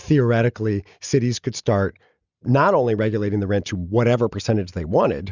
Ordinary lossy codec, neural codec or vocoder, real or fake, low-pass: Opus, 64 kbps; none; real; 7.2 kHz